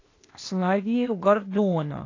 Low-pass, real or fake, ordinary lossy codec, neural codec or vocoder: 7.2 kHz; fake; AAC, 32 kbps; codec, 16 kHz, 0.8 kbps, ZipCodec